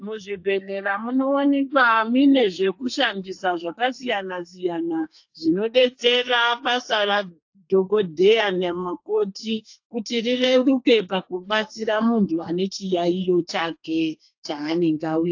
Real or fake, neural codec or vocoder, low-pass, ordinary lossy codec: fake; codec, 32 kHz, 1.9 kbps, SNAC; 7.2 kHz; AAC, 48 kbps